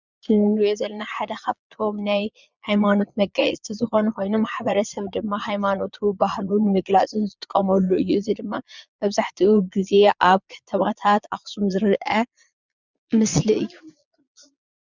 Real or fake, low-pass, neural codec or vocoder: fake; 7.2 kHz; vocoder, 22.05 kHz, 80 mel bands, Vocos